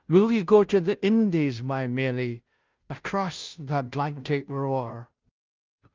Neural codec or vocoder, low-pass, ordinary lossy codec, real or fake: codec, 16 kHz, 0.5 kbps, FunCodec, trained on Chinese and English, 25 frames a second; 7.2 kHz; Opus, 24 kbps; fake